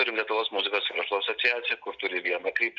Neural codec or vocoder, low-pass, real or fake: none; 7.2 kHz; real